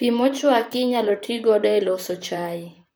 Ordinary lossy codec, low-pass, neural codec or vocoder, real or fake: none; none; vocoder, 44.1 kHz, 128 mel bands, Pupu-Vocoder; fake